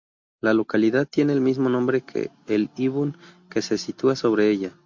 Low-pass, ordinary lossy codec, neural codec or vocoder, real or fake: 7.2 kHz; MP3, 48 kbps; none; real